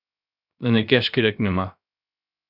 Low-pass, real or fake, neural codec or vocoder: 5.4 kHz; fake; codec, 16 kHz, 0.3 kbps, FocalCodec